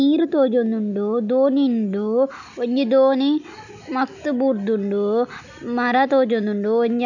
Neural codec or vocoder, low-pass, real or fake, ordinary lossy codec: none; 7.2 kHz; real; none